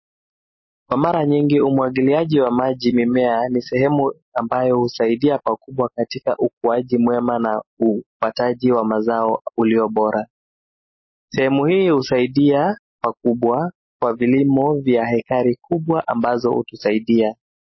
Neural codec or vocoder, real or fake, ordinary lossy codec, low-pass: none; real; MP3, 24 kbps; 7.2 kHz